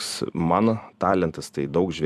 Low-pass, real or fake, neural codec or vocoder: 14.4 kHz; fake; vocoder, 44.1 kHz, 128 mel bands every 256 samples, BigVGAN v2